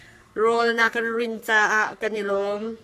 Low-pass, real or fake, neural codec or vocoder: 14.4 kHz; fake; codec, 44.1 kHz, 3.4 kbps, Pupu-Codec